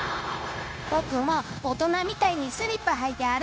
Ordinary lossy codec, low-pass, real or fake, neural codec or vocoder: none; none; fake; codec, 16 kHz, 0.9 kbps, LongCat-Audio-Codec